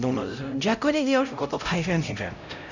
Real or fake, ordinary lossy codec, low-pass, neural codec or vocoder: fake; none; 7.2 kHz; codec, 16 kHz, 0.5 kbps, X-Codec, HuBERT features, trained on LibriSpeech